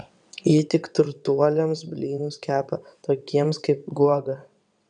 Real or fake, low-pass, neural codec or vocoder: fake; 9.9 kHz; vocoder, 22.05 kHz, 80 mel bands, WaveNeXt